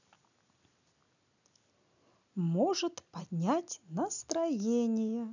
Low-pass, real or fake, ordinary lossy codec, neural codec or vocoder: 7.2 kHz; real; none; none